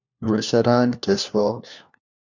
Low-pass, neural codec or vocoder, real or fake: 7.2 kHz; codec, 16 kHz, 1 kbps, FunCodec, trained on LibriTTS, 50 frames a second; fake